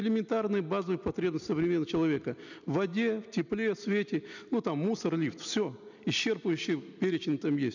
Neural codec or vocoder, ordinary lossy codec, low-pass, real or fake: none; none; 7.2 kHz; real